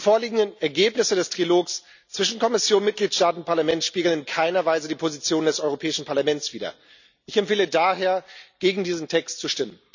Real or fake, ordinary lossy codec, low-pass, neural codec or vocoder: real; none; 7.2 kHz; none